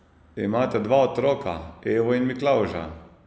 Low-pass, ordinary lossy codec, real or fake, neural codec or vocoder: none; none; real; none